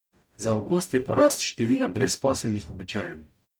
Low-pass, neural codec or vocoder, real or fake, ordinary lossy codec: none; codec, 44.1 kHz, 0.9 kbps, DAC; fake; none